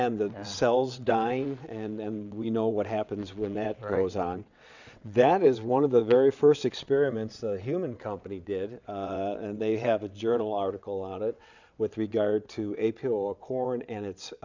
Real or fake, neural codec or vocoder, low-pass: fake; vocoder, 22.05 kHz, 80 mel bands, WaveNeXt; 7.2 kHz